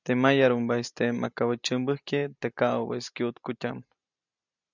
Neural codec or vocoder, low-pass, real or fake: none; 7.2 kHz; real